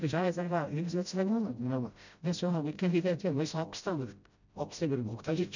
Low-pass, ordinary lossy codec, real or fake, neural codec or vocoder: 7.2 kHz; none; fake; codec, 16 kHz, 0.5 kbps, FreqCodec, smaller model